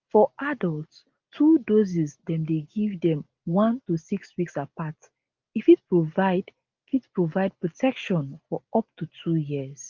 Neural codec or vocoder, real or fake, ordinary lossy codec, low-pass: none; real; Opus, 32 kbps; 7.2 kHz